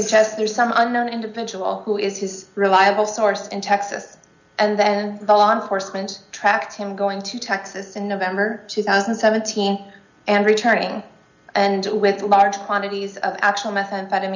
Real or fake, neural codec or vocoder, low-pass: real; none; 7.2 kHz